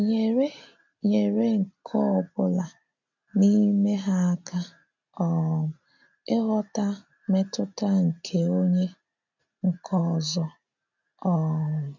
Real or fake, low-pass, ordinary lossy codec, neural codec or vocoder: real; 7.2 kHz; none; none